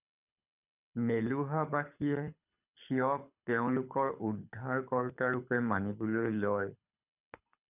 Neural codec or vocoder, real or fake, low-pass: codec, 24 kHz, 6 kbps, HILCodec; fake; 3.6 kHz